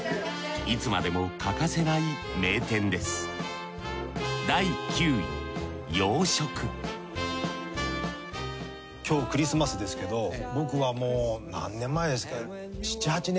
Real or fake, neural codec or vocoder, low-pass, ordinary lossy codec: real; none; none; none